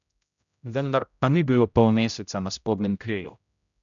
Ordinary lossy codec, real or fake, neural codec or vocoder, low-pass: none; fake; codec, 16 kHz, 0.5 kbps, X-Codec, HuBERT features, trained on general audio; 7.2 kHz